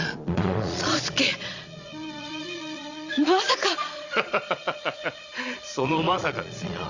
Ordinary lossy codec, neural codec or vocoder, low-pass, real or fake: Opus, 64 kbps; vocoder, 22.05 kHz, 80 mel bands, Vocos; 7.2 kHz; fake